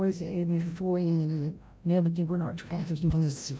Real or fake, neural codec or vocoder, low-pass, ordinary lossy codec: fake; codec, 16 kHz, 0.5 kbps, FreqCodec, larger model; none; none